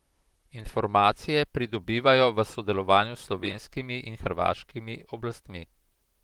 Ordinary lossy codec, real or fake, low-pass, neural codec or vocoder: Opus, 24 kbps; fake; 19.8 kHz; vocoder, 44.1 kHz, 128 mel bands, Pupu-Vocoder